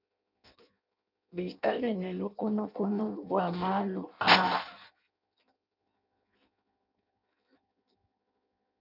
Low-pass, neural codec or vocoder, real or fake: 5.4 kHz; codec, 16 kHz in and 24 kHz out, 0.6 kbps, FireRedTTS-2 codec; fake